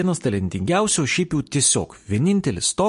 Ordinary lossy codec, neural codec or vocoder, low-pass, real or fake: MP3, 48 kbps; none; 10.8 kHz; real